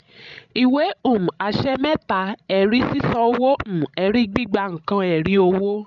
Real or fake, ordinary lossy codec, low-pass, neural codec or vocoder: fake; none; 7.2 kHz; codec, 16 kHz, 16 kbps, FreqCodec, larger model